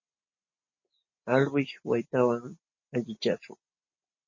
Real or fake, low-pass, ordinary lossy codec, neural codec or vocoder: real; 7.2 kHz; MP3, 32 kbps; none